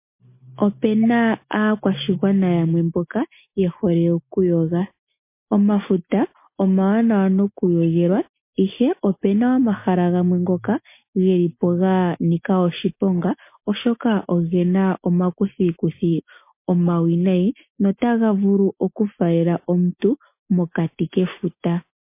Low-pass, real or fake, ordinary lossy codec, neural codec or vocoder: 3.6 kHz; real; MP3, 24 kbps; none